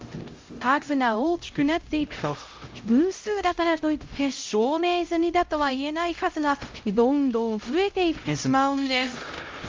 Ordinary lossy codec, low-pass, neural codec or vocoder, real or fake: Opus, 32 kbps; 7.2 kHz; codec, 16 kHz, 0.5 kbps, X-Codec, HuBERT features, trained on LibriSpeech; fake